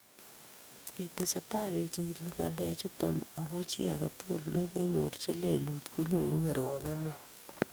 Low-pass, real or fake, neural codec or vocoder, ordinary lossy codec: none; fake; codec, 44.1 kHz, 2.6 kbps, DAC; none